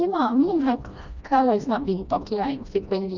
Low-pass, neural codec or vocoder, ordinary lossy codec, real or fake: 7.2 kHz; codec, 16 kHz, 1 kbps, FreqCodec, smaller model; none; fake